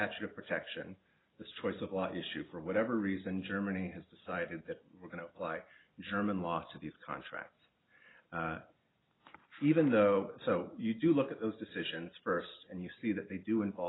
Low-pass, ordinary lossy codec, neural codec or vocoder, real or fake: 7.2 kHz; AAC, 16 kbps; none; real